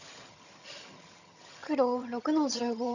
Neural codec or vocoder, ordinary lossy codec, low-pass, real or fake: vocoder, 22.05 kHz, 80 mel bands, HiFi-GAN; none; 7.2 kHz; fake